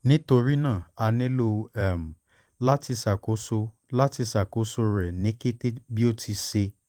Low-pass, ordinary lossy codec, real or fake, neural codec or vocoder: 19.8 kHz; Opus, 24 kbps; fake; vocoder, 44.1 kHz, 128 mel bands every 512 samples, BigVGAN v2